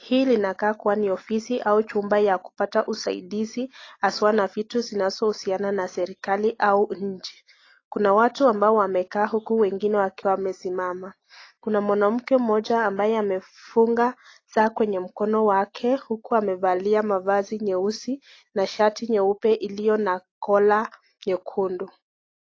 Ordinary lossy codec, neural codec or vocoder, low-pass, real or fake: AAC, 32 kbps; none; 7.2 kHz; real